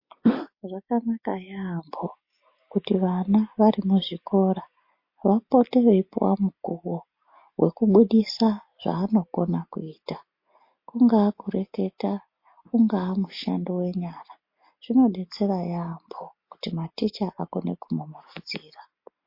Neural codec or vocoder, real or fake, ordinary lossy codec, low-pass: none; real; MP3, 32 kbps; 5.4 kHz